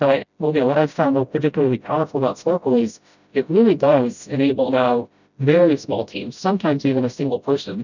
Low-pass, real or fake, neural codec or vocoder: 7.2 kHz; fake; codec, 16 kHz, 0.5 kbps, FreqCodec, smaller model